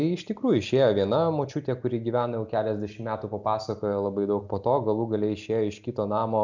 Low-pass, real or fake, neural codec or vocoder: 7.2 kHz; real; none